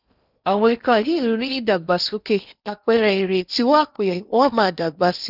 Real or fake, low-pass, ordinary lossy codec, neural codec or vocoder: fake; 5.4 kHz; none; codec, 16 kHz in and 24 kHz out, 0.8 kbps, FocalCodec, streaming, 65536 codes